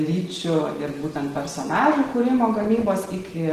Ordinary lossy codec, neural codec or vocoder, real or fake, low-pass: Opus, 16 kbps; none; real; 14.4 kHz